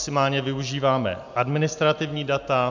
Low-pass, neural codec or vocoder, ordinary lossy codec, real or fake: 7.2 kHz; none; MP3, 64 kbps; real